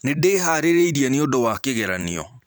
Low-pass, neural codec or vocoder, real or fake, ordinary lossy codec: none; vocoder, 44.1 kHz, 128 mel bands every 512 samples, BigVGAN v2; fake; none